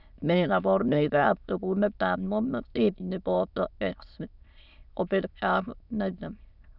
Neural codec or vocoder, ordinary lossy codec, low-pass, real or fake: autoencoder, 22.05 kHz, a latent of 192 numbers a frame, VITS, trained on many speakers; none; 5.4 kHz; fake